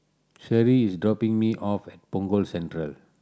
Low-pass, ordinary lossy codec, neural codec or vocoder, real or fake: none; none; none; real